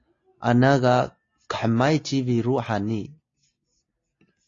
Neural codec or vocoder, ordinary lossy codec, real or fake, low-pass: none; AAC, 32 kbps; real; 7.2 kHz